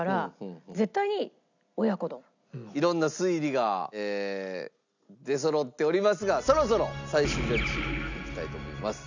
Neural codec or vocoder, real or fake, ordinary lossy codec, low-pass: none; real; none; 7.2 kHz